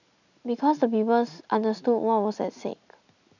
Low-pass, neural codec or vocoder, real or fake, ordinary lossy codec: 7.2 kHz; none; real; none